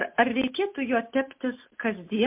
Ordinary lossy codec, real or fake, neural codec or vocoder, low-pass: MP3, 32 kbps; real; none; 3.6 kHz